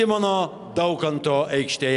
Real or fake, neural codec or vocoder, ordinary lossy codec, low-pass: real; none; MP3, 96 kbps; 10.8 kHz